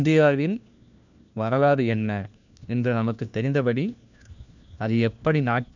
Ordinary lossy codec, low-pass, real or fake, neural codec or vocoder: none; 7.2 kHz; fake; codec, 16 kHz, 1 kbps, FunCodec, trained on LibriTTS, 50 frames a second